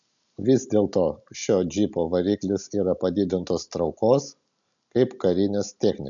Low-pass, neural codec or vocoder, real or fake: 7.2 kHz; none; real